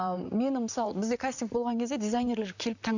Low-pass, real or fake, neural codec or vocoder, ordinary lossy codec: 7.2 kHz; fake; vocoder, 44.1 kHz, 128 mel bands, Pupu-Vocoder; none